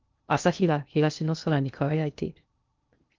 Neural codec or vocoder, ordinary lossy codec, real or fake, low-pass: codec, 16 kHz in and 24 kHz out, 0.6 kbps, FocalCodec, streaming, 2048 codes; Opus, 32 kbps; fake; 7.2 kHz